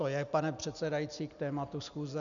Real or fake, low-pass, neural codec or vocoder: real; 7.2 kHz; none